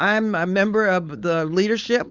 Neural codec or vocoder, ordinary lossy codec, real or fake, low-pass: codec, 16 kHz, 4.8 kbps, FACodec; Opus, 64 kbps; fake; 7.2 kHz